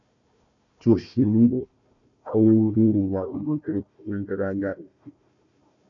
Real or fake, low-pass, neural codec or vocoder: fake; 7.2 kHz; codec, 16 kHz, 1 kbps, FunCodec, trained on Chinese and English, 50 frames a second